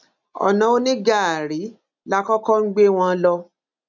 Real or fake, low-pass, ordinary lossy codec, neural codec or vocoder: real; 7.2 kHz; none; none